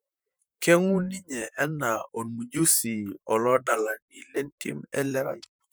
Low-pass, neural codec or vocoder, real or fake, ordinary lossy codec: none; vocoder, 44.1 kHz, 128 mel bands, Pupu-Vocoder; fake; none